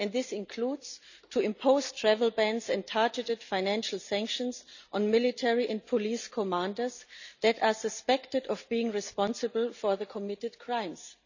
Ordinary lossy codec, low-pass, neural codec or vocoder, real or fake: none; 7.2 kHz; none; real